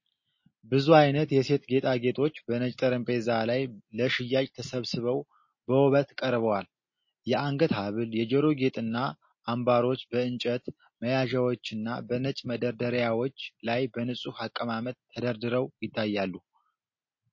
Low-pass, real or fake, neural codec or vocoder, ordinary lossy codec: 7.2 kHz; real; none; MP3, 32 kbps